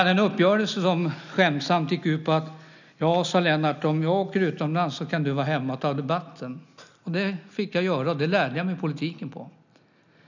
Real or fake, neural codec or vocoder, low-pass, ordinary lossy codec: real; none; 7.2 kHz; none